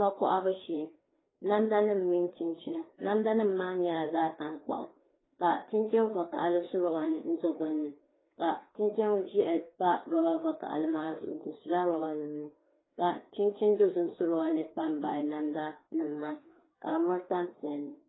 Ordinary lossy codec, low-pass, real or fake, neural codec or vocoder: AAC, 16 kbps; 7.2 kHz; fake; codec, 16 kHz, 2 kbps, FreqCodec, larger model